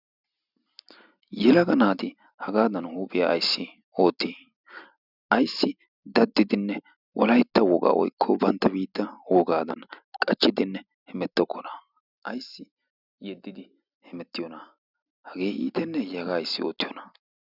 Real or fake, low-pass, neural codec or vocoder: real; 5.4 kHz; none